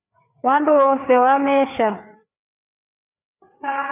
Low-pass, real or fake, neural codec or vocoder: 3.6 kHz; fake; codec, 16 kHz, 4 kbps, FreqCodec, larger model